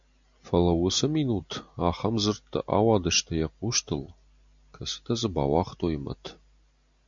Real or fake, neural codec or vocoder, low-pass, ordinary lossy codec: real; none; 7.2 kHz; MP3, 64 kbps